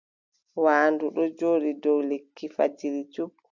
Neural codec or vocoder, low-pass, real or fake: none; 7.2 kHz; real